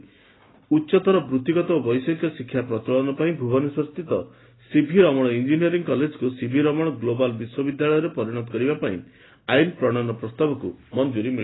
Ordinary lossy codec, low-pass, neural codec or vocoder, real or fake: AAC, 16 kbps; 7.2 kHz; none; real